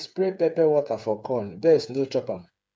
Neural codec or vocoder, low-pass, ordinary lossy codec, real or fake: codec, 16 kHz, 8 kbps, FreqCodec, smaller model; none; none; fake